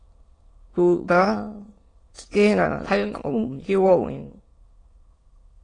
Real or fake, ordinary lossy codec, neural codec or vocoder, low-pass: fake; AAC, 32 kbps; autoencoder, 22.05 kHz, a latent of 192 numbers a frame, VITS, trained on many speakers; 9.9 kHz